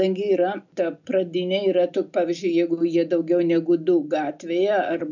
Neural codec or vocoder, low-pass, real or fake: none; 7.2 kHz; real